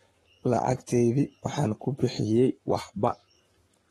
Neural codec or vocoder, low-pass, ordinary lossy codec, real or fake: codec, 44.1 kHz, 7.8 kbps, Pupu-Codec; 19.8 kHz; AAC, 32 kbps; fake